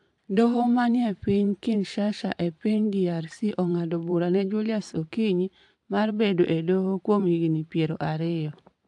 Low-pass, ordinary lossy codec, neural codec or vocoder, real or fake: 10.8 kHz; AAC, 64 kbps; vocoder, 44.1 kHz, 128 mel bands every 512 samples, BigVGAN v2; fake